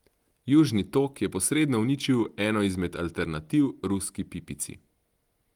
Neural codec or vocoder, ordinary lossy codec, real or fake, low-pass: none; Opus, 24 kbps; real; 19.8 kHz